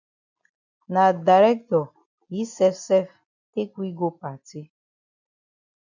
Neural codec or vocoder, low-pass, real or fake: none; 7.2 kHz; real